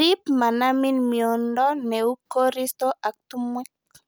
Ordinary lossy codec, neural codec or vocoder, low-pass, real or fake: none; none; none; real